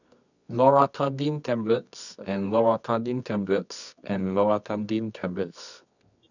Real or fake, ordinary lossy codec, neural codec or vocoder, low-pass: fake; none; codec, 24 kHz, 0.9 kbps, WavTokenizer, medium music audio release; 7.2 kHz